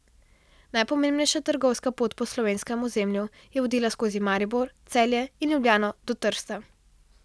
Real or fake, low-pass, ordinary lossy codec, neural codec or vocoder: real; none; none; none